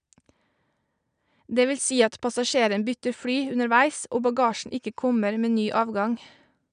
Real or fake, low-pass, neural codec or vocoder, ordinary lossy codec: real; 9.9 kHz; none; none